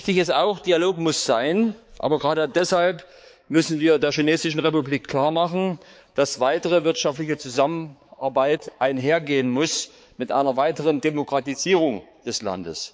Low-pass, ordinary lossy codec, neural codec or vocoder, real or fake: none; none; codec, 16 kHz, 4 kbps, X-Codec, HuBERT features, trained on balanced general audio; fake